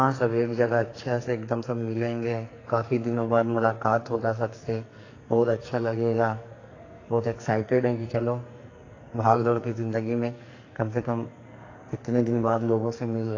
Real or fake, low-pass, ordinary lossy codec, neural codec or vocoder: fake; 7.2 kHz; AAC, 32 kbps; codec, 44.1 kHz, 2.6 kbps, SNAC